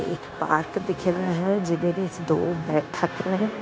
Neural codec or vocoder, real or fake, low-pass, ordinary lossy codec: codec, 16 kHz, 0.9 kbps, LongCat-Audio-Codec; fake; none; none